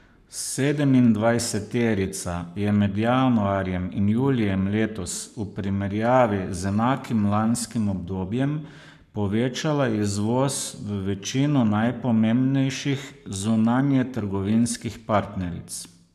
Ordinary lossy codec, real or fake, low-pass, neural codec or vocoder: none; fake; 14.4 kHz; codec, 44.1 kHz, 7.8 kbps, Pupu-Codec